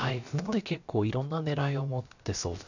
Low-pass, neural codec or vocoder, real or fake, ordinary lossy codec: 7.2 kHz; codec, 16 kHz, about 1 kbps, DyCAST, with the encoder's durations; fake; none